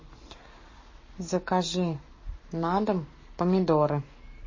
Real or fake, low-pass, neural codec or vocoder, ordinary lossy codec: fake; 7.2 kHz; codec, 44.1 kHz, 7.8 kbps, Pupu-Codec; MP3, 32 kbps